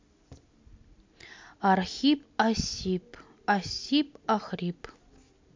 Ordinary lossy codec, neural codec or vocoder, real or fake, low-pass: MP3, 48 kbps; none; real; 7.2 kHz